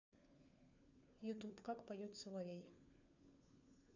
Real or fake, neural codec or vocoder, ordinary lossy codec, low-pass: fake; codec, 16 kHz, 4 kbps, FunCodec, trained on LibriTTS, 50 frames a second; none; 7.2 kHz